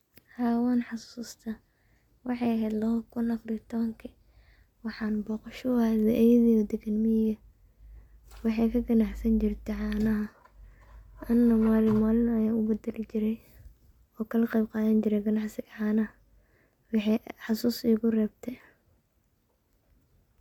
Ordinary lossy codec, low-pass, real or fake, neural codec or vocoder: MP3, 96 kbps; 19.8 kHz; real; none